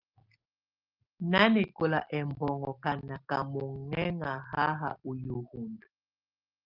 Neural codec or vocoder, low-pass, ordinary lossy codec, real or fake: none; 5.4 kHz; Opus, 24 kbps; real